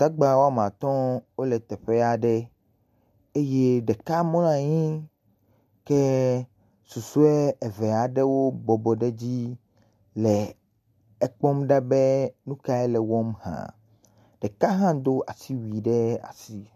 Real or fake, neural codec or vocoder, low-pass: real; none; 14.4 kHz